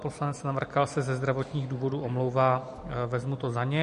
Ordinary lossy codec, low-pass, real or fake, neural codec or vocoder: MP3, 48 kbps; 14.4 kHz; real; none